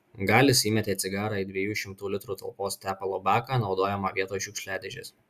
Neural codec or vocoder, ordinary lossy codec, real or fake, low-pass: none; Opus, 64 kbps; real; 14.4 kHz